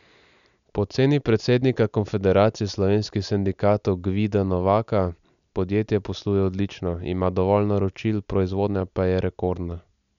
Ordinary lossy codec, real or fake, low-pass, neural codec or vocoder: none; real; 7.2 kHz; none